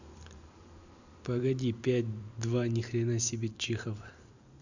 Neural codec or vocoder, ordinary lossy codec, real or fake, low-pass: vocoder, 44.1 kHz, 128 mel bands every 512 samples, BigVGAN v2; none; fake; 7.2 kHz